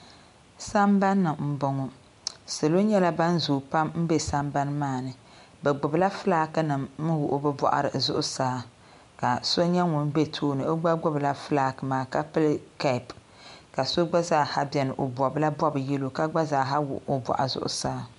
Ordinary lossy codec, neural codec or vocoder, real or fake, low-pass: MP3, 64 kbps; none; real; 10.8 kHz